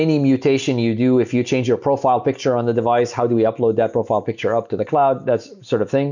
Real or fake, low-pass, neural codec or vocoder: real; 7.2 kHz; none